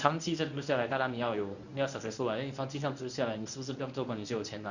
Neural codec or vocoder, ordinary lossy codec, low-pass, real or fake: codec, 24 kHz, 0.9 kbps, WavTokenizer, medium speech release version 1; none; 7.2 kHz; fake